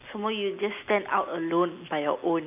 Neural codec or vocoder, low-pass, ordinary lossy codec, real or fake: none; 3.6 kHz; none; real